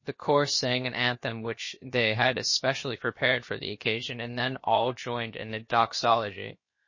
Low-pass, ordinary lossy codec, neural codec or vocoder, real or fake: 7.2 kHz; MP3, 32 kbps; codec, 16 kHz, about 1 kbps, DyCAST, with the encoder's durations; fake